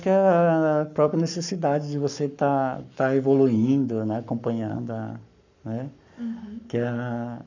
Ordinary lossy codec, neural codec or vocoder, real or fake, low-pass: none; codec, 44.1 kHz, 7.8 kbps, Pupu-Codec; fake; 7.2 kHz